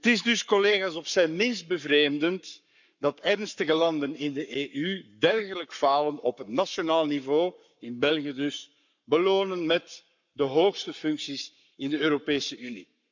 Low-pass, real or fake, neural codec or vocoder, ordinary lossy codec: 7.2 kHz; fake; codec, 44.1 kHz, 7.8 kbps, Pupu-Codec; none